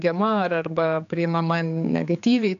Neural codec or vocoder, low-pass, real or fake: codec, 16 kHz, 4 kbps, X-Codec, HuBERT features, trained on general audio; 7.2 kHz; fake